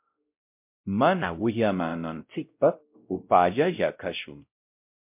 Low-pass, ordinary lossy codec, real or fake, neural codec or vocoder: 3.6 kHz; MP3, 32 kbps; fake; codec, 16 kHz, 0.5 kbps, X-Codec, WavLM features, trained on Multilingual LibriSpeech